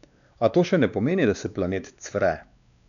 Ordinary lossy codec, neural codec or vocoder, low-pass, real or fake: none; codec, 16 kHz, 2 kbps, X-Codec, WavLM features, trained on Multilingual LibriSpeech; 7.2 kHz; fake